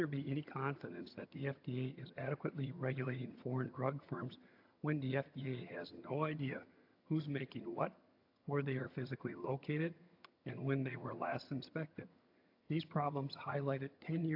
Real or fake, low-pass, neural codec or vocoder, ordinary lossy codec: fake; 5.4 kHz; vocoder, 22.05 kHz, 80 mel bands, HiFi-GAN; AAC, 48 kbps